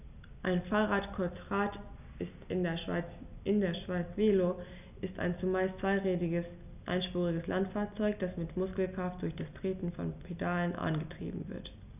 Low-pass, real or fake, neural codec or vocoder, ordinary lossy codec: 3.6 kHz; real; none; none